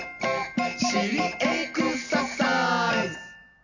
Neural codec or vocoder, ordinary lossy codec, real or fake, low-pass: none; none; real; 7.2 kHz